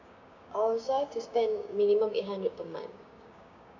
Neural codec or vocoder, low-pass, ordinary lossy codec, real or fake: codec, 16 kHz, 6 kbps, DAC; 7.2 kHz; none; fake